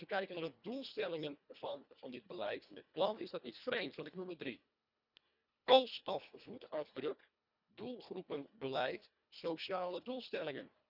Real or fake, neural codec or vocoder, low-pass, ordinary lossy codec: fake; codec, 24 kHz, 1.5 kbps, HILCodec; 5.4 kHz; none